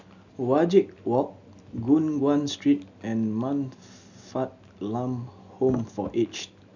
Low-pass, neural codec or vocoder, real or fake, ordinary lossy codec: 7.2 kHz; none; real; none